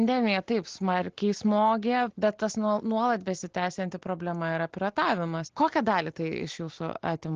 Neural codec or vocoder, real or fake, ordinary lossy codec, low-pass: none; real; Opus, 16 kbps; 7.2 kHz